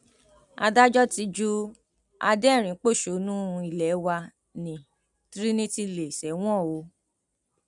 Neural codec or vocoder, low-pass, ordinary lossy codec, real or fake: none; 10.8 kHz; none; real